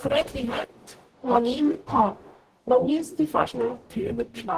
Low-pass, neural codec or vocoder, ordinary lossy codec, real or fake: 14.4 kHz; codec, 44.1 kHz, 0.9 kbps, DAC; Opus, 16 kbps; fake